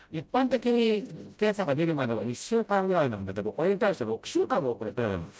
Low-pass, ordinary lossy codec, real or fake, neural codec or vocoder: none; none; fake; codec, 16 kHz, 0.5 kbps, FreqCodec, smaller model